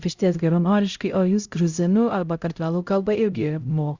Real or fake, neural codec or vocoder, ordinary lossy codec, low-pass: fake; codec, 16 kHz, 0.5 kbps, X-Codec, HuBERT features, trained on LibriSpeech; Opus, 64 kbps; 7.2 kHz